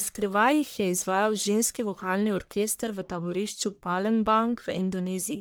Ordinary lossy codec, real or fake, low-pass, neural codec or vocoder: none; fake; none; codec, 44.1 kHz, 1.7 kbps, Pupu-Codec